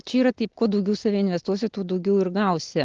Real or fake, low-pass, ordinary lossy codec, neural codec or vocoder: real; 7.2 kHz; Opus, 16 kbps; none